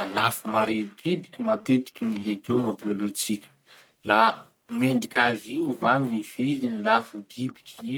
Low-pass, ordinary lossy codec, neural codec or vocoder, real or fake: none; none; codec, 44.1 kHz, 1.7 kbps, Pupu-Codec; fake